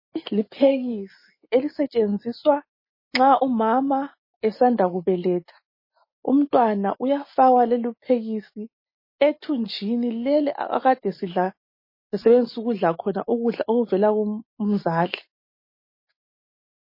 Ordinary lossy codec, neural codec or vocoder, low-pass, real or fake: MP3, 24 kbps; none; 5.4 kHz; real